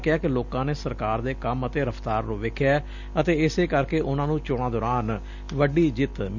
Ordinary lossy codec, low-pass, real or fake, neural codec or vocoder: none; 7.2 kHz; real; none